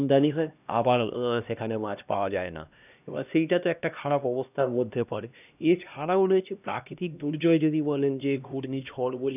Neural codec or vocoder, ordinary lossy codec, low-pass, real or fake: codec, 16 kHz, 1 kbps, X-Codec, HuBERT features, trained on LibriSpeech; none; 3.6 kHz; fake